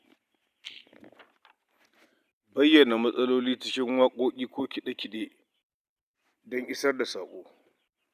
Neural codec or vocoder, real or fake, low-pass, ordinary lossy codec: none; real; 14.4 kHz; none